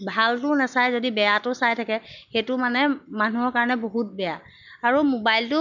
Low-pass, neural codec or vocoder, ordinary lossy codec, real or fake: 7.2 kHz; none; none; real